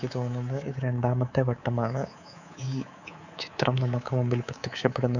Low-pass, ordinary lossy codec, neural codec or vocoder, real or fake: 7.2 kHz; none; codec, 24 kHz, 3.1 kbps, DualCodec; fake